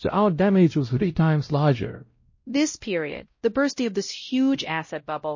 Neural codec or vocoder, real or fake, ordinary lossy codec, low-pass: codec, 16 kHz, 0.5 kbps, X-Codec, WavLM features, trained on Multilingual LibriSpeech; fake; MP3, 32 kbps; 7.2 kHz